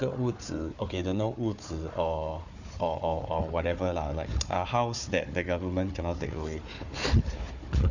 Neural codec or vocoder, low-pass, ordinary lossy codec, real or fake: codec, 16 kHz, 4 kbps, FunCodec, trained on Chinese and English, 50 frames a second; 7.2 kHz; none; fake